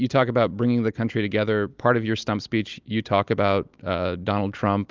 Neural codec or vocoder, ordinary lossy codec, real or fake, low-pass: none; Opus, 24 kbps; real; 7.2 kHz